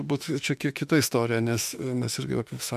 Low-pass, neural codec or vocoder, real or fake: 14.4 kHz; autoencoder, 48 kHz, 32 numbers a frame, DAC-VAE, trained on Japanese speech; fake